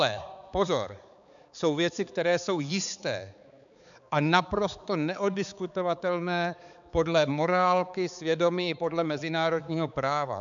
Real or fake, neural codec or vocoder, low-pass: fake; codec, 16 kHz, 4 kbps, X-Codec, HuBERT features, trained on balanced general audio; 7.2 kHz